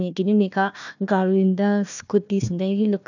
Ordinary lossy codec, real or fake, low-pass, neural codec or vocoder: none; fake; 7.2 kHz; codec, 16 kHz, 1 kbps, FunCodec, trained on Chinese and English, 50 frames a second